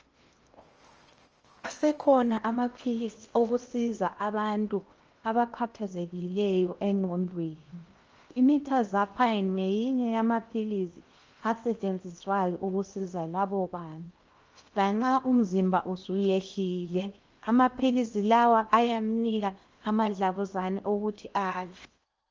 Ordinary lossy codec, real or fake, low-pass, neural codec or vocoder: Opus, 24 kbps; fake; 7.2 kHz; codec, 16 kHz in and 24 kHz out, 0.8 kbps, FocalCodec, streaming, 65536 codes